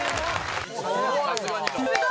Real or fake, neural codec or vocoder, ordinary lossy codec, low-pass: real; none; none; none